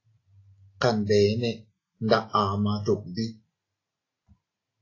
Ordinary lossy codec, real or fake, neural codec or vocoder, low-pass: AAC, 32 kbps; real; none; 7.2 kHz